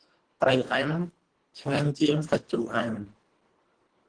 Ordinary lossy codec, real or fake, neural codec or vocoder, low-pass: Opus, 16 kbps; fake; codec, 24 kHz, 1.5 kbps, HILCodec; 9.9 kHz